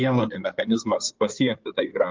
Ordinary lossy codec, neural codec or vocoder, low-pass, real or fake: Opus, 24 kbps; codec, 16 kHz in and 24 kHz out, 2.2 kbps, FireRedTTS-2 codec; 7.2 kHz; fake